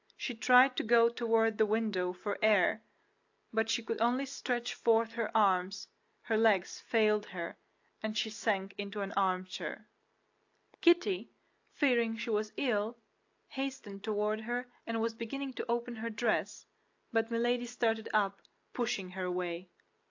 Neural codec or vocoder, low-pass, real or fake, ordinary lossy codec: none; 7.2 kHz; real; AAC, 48 kbps